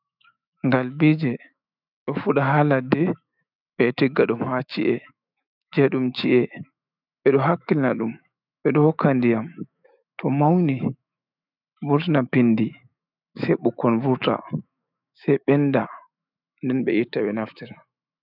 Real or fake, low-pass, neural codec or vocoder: fake; 5.4 kHz; autoencoder, 48 kHz, 128 numbers a frame, DAC-VAE, trained on Japanese speech